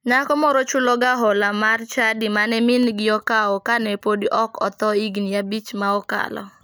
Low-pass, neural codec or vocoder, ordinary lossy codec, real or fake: none; none; none; real